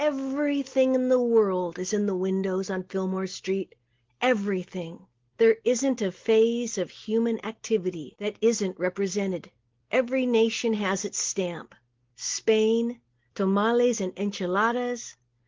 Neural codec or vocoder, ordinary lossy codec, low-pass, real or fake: none; Opus, 16 kbps; 7.2 kHz; real